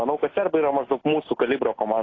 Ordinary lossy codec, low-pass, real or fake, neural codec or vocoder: AAC, 32 kbps; 7.2 kHz; real; none